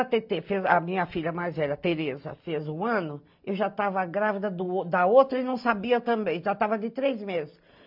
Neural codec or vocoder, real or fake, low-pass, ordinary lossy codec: none; real; 5.4 kHz; MP3, 48 kbps